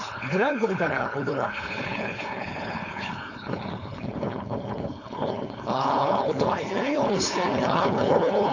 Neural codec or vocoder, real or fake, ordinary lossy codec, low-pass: codec, 16 kHz, 4.8 kbps, FACodec; fake; AAC, 48 kbps; 7.2 kHz